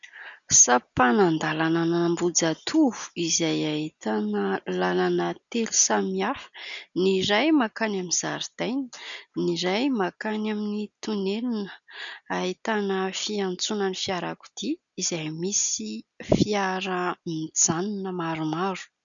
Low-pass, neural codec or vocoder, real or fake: 7.2 kHz; none; real